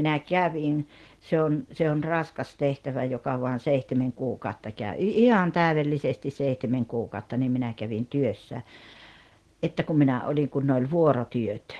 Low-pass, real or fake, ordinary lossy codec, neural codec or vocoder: 10.8 kHz; real; Opus, 16 kbps; none